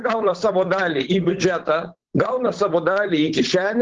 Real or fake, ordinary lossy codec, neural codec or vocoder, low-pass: fake; Opus, 16 kbps; codec, 16 kHz, 8 kbps, FunCodec, trained on Chinese and English, 25 frames a second; 7.2 kHz